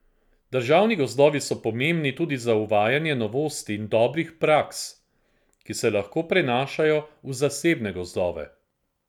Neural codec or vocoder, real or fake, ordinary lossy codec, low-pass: none; real; none; 19.8 kHz